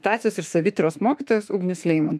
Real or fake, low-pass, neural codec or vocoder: fake; 14.4 kHz; autoencoder, 48 kHz, 32 numbers a frame, DAC-VAE, trained on Japanese speech